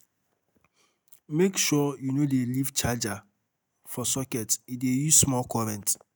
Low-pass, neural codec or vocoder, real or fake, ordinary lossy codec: none; none; real; none